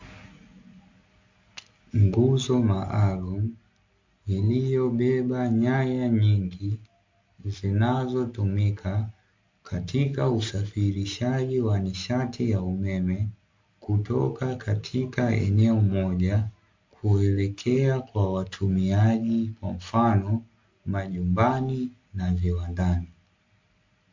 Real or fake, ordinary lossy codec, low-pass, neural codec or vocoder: real; MP3, 48 kbps; 7.2 kHz; none